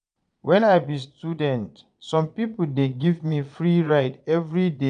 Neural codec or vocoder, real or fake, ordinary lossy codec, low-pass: vocoder, 22.05 kHz, 80 mel bands, Vocos; fake; none; 9.9 kHz